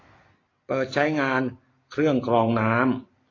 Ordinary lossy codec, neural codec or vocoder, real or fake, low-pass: AAC, 32 kbps; none; real; 7.2 kHz